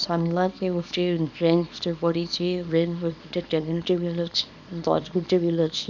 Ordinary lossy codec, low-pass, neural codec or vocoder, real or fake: none; 7.2 kHz; codec, 24 kHz, 0.9 kbps, WavTokenizer, small release; fake